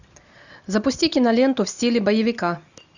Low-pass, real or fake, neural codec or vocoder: 7.2 kHz; real; none